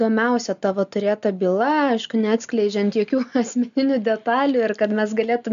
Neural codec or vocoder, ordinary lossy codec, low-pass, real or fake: none; MP3, 64 kbps; 7.2 kHz; real